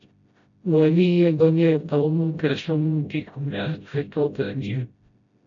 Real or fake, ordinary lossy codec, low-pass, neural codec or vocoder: fake; AAC, 64 kbps; 7.2 kHz; codec, 16 kHz, 0.5 kbps, FreqCodec, smaller model